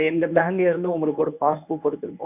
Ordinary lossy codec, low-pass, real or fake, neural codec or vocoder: none; 3.6 kHz; fake; codec, 24 kHz, 0.9 kbps, WavTokenizer, medium speech release version 1